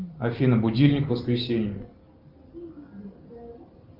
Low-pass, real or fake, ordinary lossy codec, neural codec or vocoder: 5.4 kHz; real; Opus, 24 kbps; none